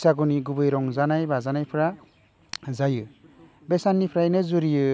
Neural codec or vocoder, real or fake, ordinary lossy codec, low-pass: none; real; none; none